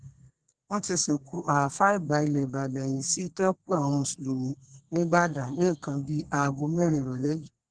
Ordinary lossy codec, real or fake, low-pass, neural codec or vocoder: Opus, 16 kbps; fake; 9.9 kHz; codec, 32 kHz, 1.9 kbps, SNAC